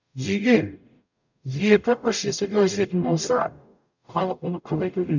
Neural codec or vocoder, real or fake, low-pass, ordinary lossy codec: codec, 44.1 kHz, 0.9 kbps, DAC; fake; 7.2 kHz; none